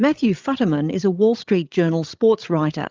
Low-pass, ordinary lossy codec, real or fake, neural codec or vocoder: 7.2 kHz; Opus, 32 kbps; fake; codec, 16 kHz, 16 kbps, FreqCodec, larger model